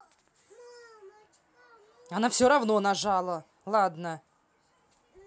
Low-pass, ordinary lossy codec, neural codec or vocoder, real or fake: none; none; none; real